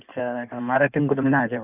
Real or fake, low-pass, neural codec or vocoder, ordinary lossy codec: fake; 3.6 kHz; codec, 16 kHz in and 24 kHz out, 1.1 kbps, FireRedTTS-2 codec; none